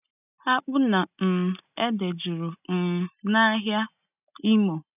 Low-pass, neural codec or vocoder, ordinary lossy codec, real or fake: 3.6 kHz; none; none; real